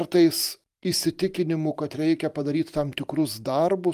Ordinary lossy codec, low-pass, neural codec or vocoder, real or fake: Opus, 32 kbps; 14.4 kHz; vocoder, 44.1 kHz, 128 mel bands every 512 samples, BigVGAN v2; fake